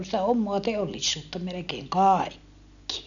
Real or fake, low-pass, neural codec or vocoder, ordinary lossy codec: real; 7.2 kHz; none; none